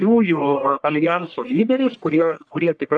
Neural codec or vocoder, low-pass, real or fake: codec, 44.1 kHz, 1.7 kbps, Pupu-Codec; 9.9 kHz; fake